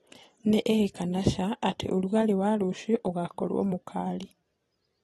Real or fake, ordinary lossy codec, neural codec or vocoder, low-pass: fake; AAC, 32 kbps; vocoder, 44.1 kHz, 128 mel bands every 256 samples, BigVGAN v2; 19.8 kHz